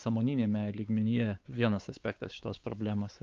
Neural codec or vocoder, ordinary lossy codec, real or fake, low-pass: codec, 16 kHz, 4 kbps, X-Codec, WavLM features, trained on Multilingual LibriSpeech; Opus, 32 kbps; fake; 7.2 kHz